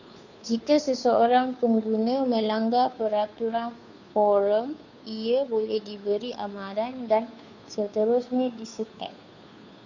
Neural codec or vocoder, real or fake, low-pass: codec, 16 kHz, 2 kbps, FunCodec, trained on Chinese and English, 25 frames a second; fake; 7.2 kHz